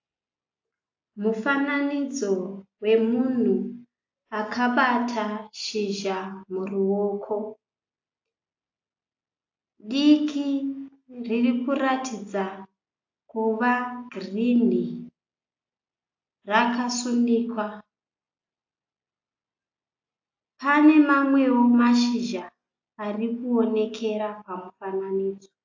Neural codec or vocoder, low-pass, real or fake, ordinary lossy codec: none; 7.2 kHz; real; AAC, 48 kbps